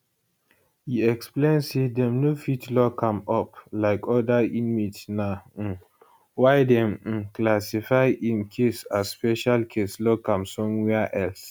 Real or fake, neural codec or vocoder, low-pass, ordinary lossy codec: real; none; none; none